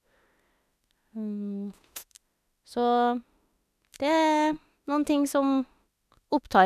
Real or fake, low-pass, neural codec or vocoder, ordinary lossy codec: fake; 14.4 kHz; autoencoder, 48 kHz, 32 numbers a frame, DAC-VAE, trained on Japanese speech; none